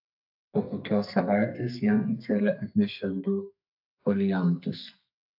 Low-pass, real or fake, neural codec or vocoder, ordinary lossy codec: 5.4 kHz; fake; codec, 32 kHz, 1.9 kbps, SNAC; AAC, 48 kbps